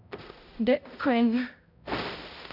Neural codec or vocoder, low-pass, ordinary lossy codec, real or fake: codec, 16 kHz, 0.5 kbps, X-Codec, HuBERT features, trained on balanced general audio; 5.4 kHz; none; fake